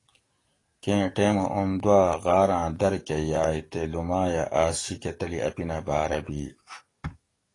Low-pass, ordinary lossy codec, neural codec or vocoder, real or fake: 10.8 kHz; AAC, 32 kbps; codec, 44.1 kHz, 7.8 kbps, DAC; fake